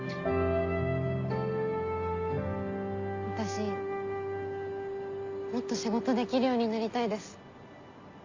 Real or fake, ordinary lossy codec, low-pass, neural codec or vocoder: real; AAC, 48 kbps; 7.2 kHz; none